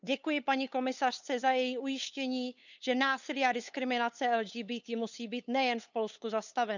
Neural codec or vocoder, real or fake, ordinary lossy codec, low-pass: codec, 16 kHz, 8 kbps, FunCodec, trained on LibriTTS, 25 frames a second; fake; none; 7.2 kHz